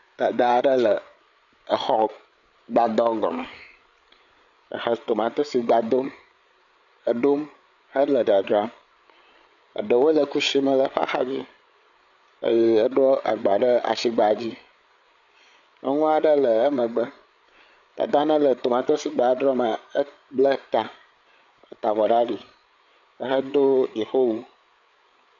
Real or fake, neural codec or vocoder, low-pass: fake; codec, 16 kHz, 8 kbps, FreqCodec, larger model; 7.2 kHz